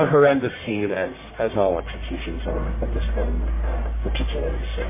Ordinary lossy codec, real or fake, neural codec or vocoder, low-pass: MP3, 16 kbps; fake; codec, 44.1 kHz, 1.7 kbps, Pupu-Codec; 3.6 kHz